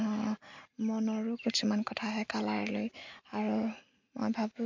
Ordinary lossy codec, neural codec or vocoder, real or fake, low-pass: MP3, 48 kbps; none; real; 7.2 kHz